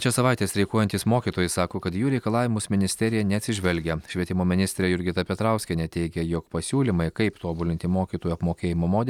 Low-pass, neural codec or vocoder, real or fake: 19.8 kHz; none; real